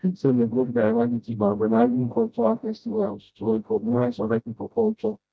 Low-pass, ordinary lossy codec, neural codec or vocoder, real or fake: none; none; codec, 16 kHz, 0.5 kbps, FreqCodec, smaller model; fake